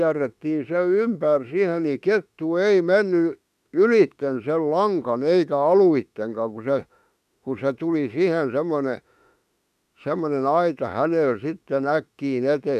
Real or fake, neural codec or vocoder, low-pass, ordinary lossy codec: fake; autoencoder, 48 kHz, 32 numbers a frame, DAC-VAE, trained on Japanese speech; 14.4 kHz; none